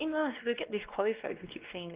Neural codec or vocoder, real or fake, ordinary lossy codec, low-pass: codec, 16 kHz, 2 kbps, X-Codec, WavLM features, trained on Multilingual LibriSpeech; fake; Opus, 32 kbps; 3.6 kHz